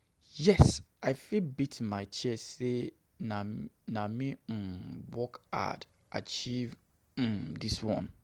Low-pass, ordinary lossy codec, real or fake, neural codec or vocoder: 19.8 kHz; Opus, 24 kbps; real; none